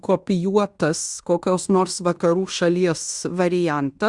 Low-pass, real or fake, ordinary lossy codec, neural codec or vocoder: 10.8 kHz; fake; Opus, 64 kbps; codec, 16 kHz in and 24 kHz out, 0.9 kbps, LongCat-Audio-Codec, fine tuned four codebook decoder